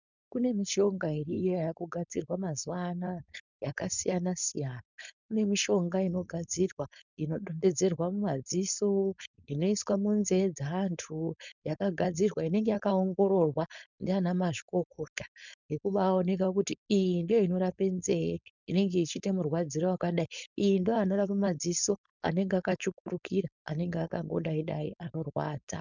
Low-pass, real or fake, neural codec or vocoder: 7.2 kHz; fake; codec, 16 kHz, 4.8 kbps, FACodec